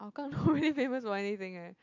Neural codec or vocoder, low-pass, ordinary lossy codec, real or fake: none; 7.2 kHz; AAC, 48 kbps; real